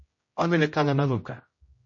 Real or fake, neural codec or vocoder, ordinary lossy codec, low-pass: fake; codec, 16 kHz, 0.5 kbps, X-Codec, HuBERT features, trained on general audio; MP3, 32 kbps; 7.2 kHz